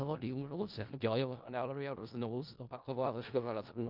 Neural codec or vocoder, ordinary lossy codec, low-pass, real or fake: codec, 16 kHz in and 24 kHz out, 0.4 kbps, LongCat-Audio-Codec, four codebook decoder; Opus, 32 kbps; 5.4 kHz; fake